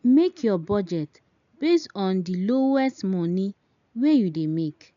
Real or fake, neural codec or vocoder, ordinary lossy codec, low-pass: real; none; none; 7.2 kHz